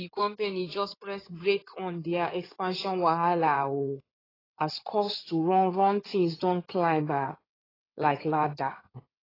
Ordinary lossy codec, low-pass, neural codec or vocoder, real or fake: AAC, 24 kbps; 5.4 kHz; codec, 16 kHz in and 24 kHz out, 2.2 kbps, FireRedTTS-2 codec; fake